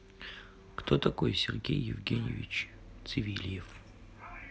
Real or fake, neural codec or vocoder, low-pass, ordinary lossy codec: real; none; none; none